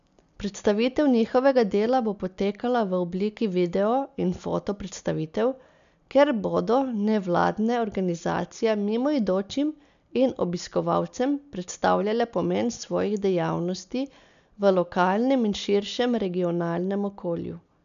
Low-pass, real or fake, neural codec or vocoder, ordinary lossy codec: 7.2 kHz; real; none; none